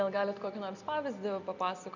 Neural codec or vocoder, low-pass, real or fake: none; 7.2 kHz; real